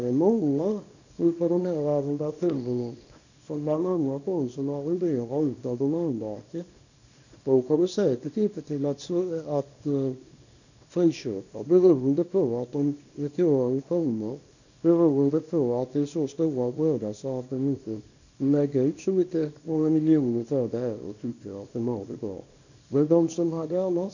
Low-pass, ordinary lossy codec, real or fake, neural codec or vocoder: 7.2 kHz; none; fake; codec, 24 kHz, 0.9 kbps, WavTokenizer, small release